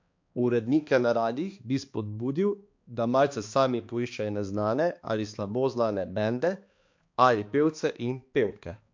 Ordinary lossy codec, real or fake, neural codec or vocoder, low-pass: MP3, 48 kbps; fake; codec, 16 kHz, 2 kbps, X-Codec, HuBERT features, trained on balanced general audio; 7.2 kHz